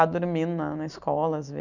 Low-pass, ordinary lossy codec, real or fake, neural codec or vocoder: 7.2 kHz; none; real; none